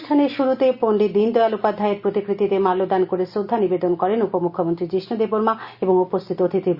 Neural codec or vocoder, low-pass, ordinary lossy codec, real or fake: none; 5.4 kHz; Opus, 64 kbps; real